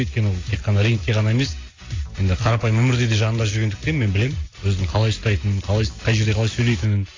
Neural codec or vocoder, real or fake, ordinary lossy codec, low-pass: none; real; AAC, 32 kbps; 7.2 kHz